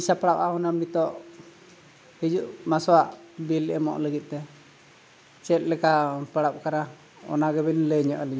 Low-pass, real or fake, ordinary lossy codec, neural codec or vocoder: none; real; none; none